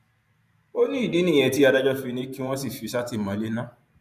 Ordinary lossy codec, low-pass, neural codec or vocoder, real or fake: none; 14.4 kHz; vocoder, 44.1 kHz, 128 mel bands every 512 samples, BigVGAN v2; fake